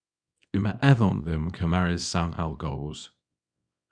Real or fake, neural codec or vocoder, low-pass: fake; codec, 24 kHz, 0.9 kbps, WavTokenizer, small release; 9.9 kHz